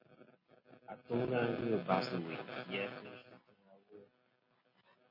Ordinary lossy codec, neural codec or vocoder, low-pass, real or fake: MP3, 24 kbps; none; 5.4 kHz; real